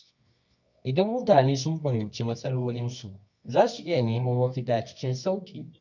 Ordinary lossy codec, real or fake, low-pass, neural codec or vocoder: none; fake; 7.2 kHz; codec, 24 kHz, 0.9 kbps, WavTokenizer, medium music audio release